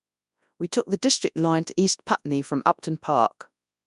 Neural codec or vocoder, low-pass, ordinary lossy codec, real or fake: codec, 24 kHz, 0.9 kbps, WavTokenizer, large speech release; 10.8 kHz; none; fake